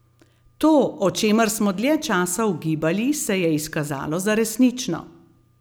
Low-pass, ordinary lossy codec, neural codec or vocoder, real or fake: none; none; none; real